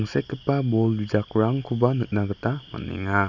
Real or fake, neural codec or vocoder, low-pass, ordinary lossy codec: real; none; 7.2 kHz; none